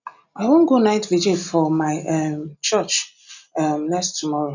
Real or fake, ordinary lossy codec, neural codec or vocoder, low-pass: real; none; none; 7.2 kHz